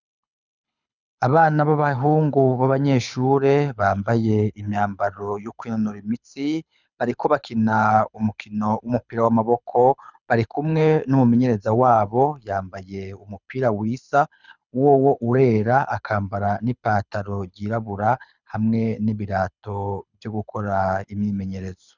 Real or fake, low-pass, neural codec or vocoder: fake; 7.2 kHz; codec, 24 kHz, 6 kbps, HILCodec